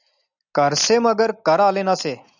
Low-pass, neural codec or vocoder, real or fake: 7.2 kHz; none; real